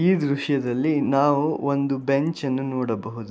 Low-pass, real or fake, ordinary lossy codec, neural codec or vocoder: none; real; none; none